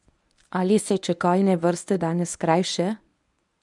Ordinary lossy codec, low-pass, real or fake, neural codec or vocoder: none; 10.8 kHz; fake; codec, 24 kHz, 0.9 kbps, WavTokenizer, medium speech release version 1